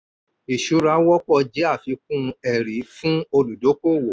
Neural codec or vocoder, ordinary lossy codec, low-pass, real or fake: none; none; none; real